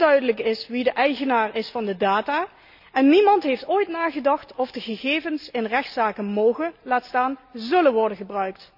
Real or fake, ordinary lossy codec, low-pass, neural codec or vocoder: real; none; 5.4 kHz; none